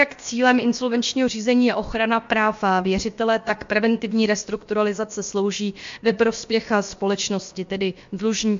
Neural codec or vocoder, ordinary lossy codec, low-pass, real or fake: codec, 16 kHz, about 1 kbps, DyCAST, with the encoder's durations; MP3, 48 kbps; 7.2 kHz; fake